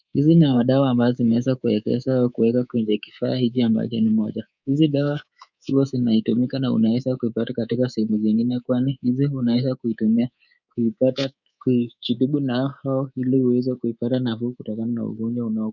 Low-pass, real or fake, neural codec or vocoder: 7.2 kHz; fake; codec, 24 kHz, 3.1 kbps, DualCodec